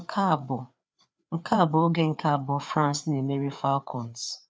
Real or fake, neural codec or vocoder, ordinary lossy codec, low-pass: fake; codec, 16 kHz, 4 kbps, FreqCodec, larger model; none; none